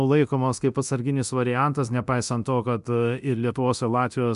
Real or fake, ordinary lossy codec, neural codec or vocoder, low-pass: fake; MP3, 96 kbps; codec, 24 kHz, 0.9 kbps, DualCodec; 10.8 kHz